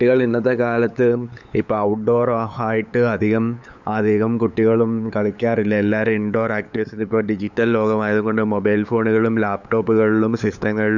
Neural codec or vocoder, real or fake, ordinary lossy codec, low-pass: codec, 16 kHz, 8 kbps, FunCodec, trained on LibriTTS, 25 frames a second; fake; none; 7.2 kHz